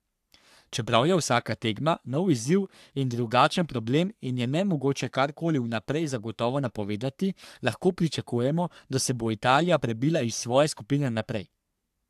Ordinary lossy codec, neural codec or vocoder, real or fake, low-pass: AAC, 96 kbps; codec, 44.1 kHz, 3.4 kbps, Pupu-Codec; fake; 14.4 kHz